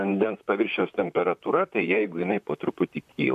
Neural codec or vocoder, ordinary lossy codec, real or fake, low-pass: vocoder, 44.1 kHz, 128 mel bands, Pupu-Vocoder; MP3, 96 kbps; fake; 14.4 kHz